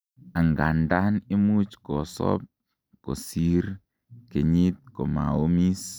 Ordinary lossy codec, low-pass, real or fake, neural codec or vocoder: none; none; real; none